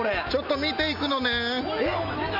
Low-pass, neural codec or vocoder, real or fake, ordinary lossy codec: 5.4 kHz; none; real; none